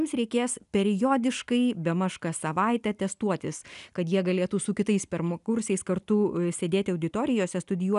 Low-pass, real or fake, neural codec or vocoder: 10.8 kHz; real; none